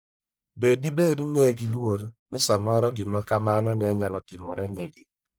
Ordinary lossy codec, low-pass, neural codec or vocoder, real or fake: none; none; codec, 44.1 kHz, 1.7 kbps, Pupu-Codec; fake